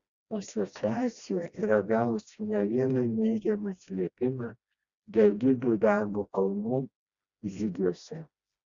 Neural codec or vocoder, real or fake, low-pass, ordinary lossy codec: codec, 16 kHz, 1 kbps, FreqCodec, smaller model; fake; 7.2 kHz; Opus, 64 kbps